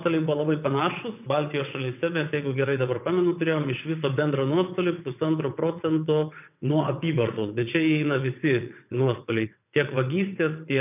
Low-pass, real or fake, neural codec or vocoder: 3.6 kHz; fake; vocoder, 22.05 kHz, 80 mel bands, WaveNeXt